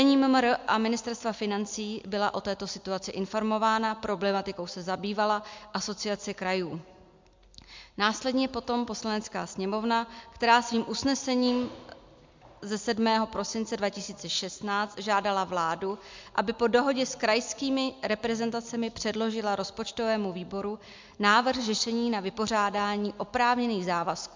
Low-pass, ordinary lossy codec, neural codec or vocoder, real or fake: 7.2 kHz; MP3, 64 kbps; none; real